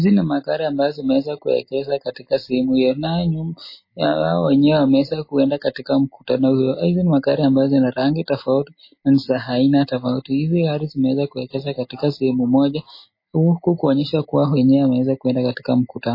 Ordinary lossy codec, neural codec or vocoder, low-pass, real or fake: MP3, 24 kbps; none; 5.4 kHz; real